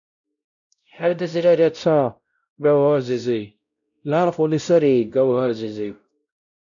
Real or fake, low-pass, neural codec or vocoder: fake; 7.2 kHz; codec, 16 kHz, 0.5 kbps, X-Codec, WavLM features, trained on Multilingual LibriSpeech